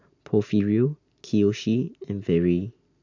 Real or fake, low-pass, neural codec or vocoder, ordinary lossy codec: real; 7.2 kHz; none; none